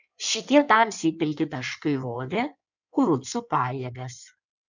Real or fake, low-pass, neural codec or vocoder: fake; 7.2 kHz; codec, 16 kHz in and 24 kHz out, 1.1 kbps, FireRedTTS-2 codec